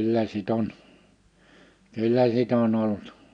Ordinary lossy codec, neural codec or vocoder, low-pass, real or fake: none; none; 9.9 kHz; real